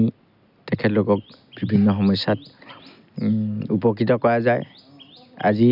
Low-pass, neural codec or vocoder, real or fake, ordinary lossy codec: 5.4 kHz; none; real; none